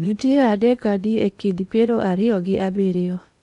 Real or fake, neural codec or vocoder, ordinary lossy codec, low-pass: fake; codec, 16 kHz in and 24 kHz out, 0.8 kbps, FocalCodec, streaming, 65536 codes; none; 10.8 kHz